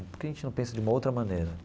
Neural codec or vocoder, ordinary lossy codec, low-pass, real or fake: none; none; none; real